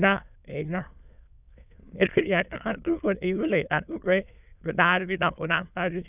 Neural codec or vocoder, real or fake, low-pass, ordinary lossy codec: autoencoder, 22.05 kHz, a latent of 192 numbers a frame, VITS, trained on many speakers; fake; 3.6 kHz; none